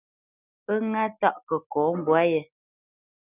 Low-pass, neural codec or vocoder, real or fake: 3.6 kHz; none; real